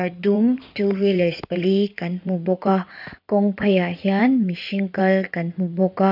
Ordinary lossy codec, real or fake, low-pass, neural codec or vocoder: none; fake; 5.4 kHz; codec, 16 kHz in and 24 kHz out, 2.2 kbps, FireRedTTS-2 codec